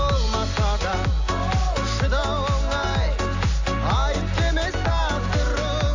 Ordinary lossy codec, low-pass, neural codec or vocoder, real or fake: AAC, 48 kbps; 7.2 kHz; none; real